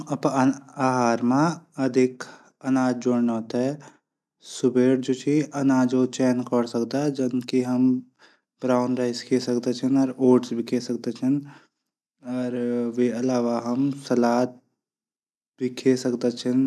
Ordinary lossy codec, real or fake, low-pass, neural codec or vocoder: none; real; none; none